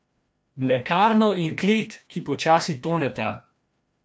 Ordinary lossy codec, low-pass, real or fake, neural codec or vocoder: none; none; fake; codec, 16 kHz, 1 kbps, FreqCodec, larger model